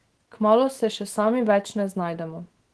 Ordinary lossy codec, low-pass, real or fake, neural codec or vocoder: Opus, 16 kbps; 10.8 kHz; fake; autoencoder, 48 kHz, 128 numbers a frame, DAC-VAE, trained on Japanese speech